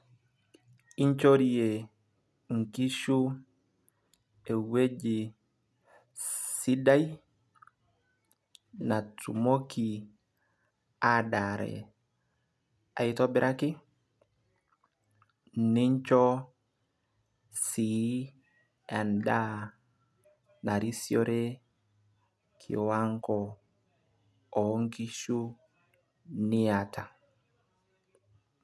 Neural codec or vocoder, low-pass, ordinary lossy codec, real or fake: none; none; none; real